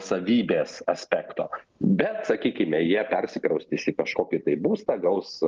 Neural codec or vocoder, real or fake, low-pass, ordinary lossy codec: none; real; 7.2 kHz; Opus, 32 kbps